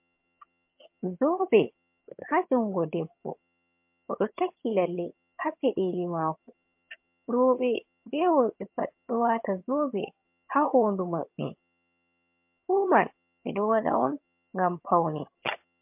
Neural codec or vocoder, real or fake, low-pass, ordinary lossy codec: vocoder, 22.05 kHz, 80 mel bands, HiFi-GAN; fake; 3.6 kHz; MP3, 32 kbps